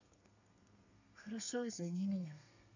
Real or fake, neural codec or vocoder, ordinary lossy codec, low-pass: fake; codec, 32 kHz, 1.9 kbps, SNAC; none; 7.2 kHz